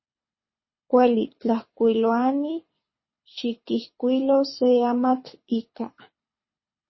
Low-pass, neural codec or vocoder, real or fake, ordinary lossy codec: 7.2 kHz; codec, 24 kHz, 6 kbps, HILCodec; fake; MP3, 24 kbps